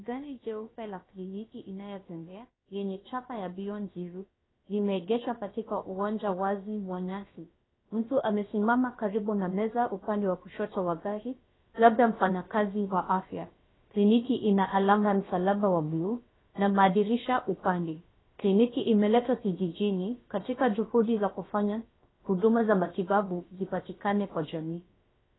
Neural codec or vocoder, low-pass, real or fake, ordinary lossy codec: codec, 16 kHz, about 1 kbps, DyCAST, with the encoder's durations; 7.2 kHz; fake; AAC, 16 kbps